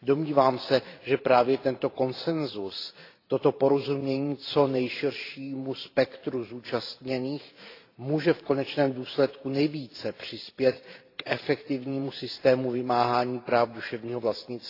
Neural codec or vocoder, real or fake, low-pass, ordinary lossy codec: vocoder, 44.1 kHz, 128 mel bands every 512 samples, BigVGAN v2; fake; 5.4 kHz; AAC, 32 kbps